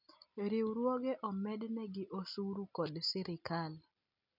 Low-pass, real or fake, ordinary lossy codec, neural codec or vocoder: 5.4 kHz; real; none; none